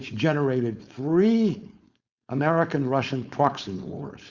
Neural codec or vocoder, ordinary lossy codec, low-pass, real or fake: codec, 16 kHz, 4.8 kbps, FACodec; Opus, 64 kbps; 7.2 kHz; fake